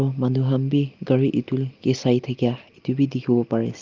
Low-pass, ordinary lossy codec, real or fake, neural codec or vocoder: 7.2 kHz; Opus, 24 kbps; real; none